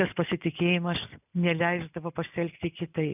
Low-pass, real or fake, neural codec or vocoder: 3.6 kHz; real; none